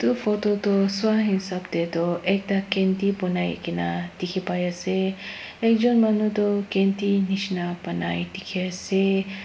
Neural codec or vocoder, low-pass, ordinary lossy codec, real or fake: none; none; none; real